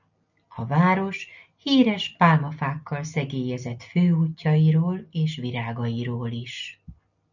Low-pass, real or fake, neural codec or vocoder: 7.2 kHz; real; none